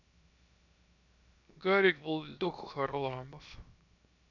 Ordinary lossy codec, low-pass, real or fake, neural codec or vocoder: none; 7.2 kHz; fake; codec, 16 kHz, 0.7 kbps, FocalCodec